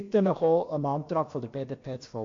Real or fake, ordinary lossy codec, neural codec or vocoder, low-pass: fake; MP3, 48 kbps; codec, 16 kHz, about 1 kbps, DyCAST, with the encoder's durations; 7.2 kHz